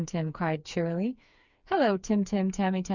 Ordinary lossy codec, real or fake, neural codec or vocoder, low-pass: Opus, 64 kbps; fake; codec, 16 kHz, 4 kbps, FreqCodec, smaller model; 7.2 kHz